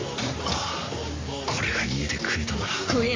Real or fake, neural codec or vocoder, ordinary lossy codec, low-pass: fake; codec, 44.1 kHz, 7.8 kbps, Pupu-Codec; AAC, 32 kbps; 7.2 kHz